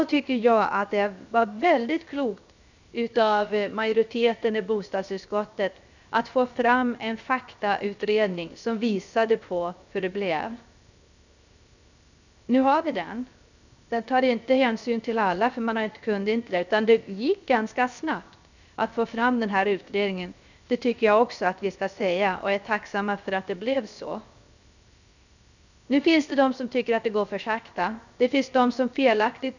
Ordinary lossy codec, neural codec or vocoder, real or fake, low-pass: none; codec, 16 kHz, 0.7 kbps, FocalCodec; fake; 7.2 kHz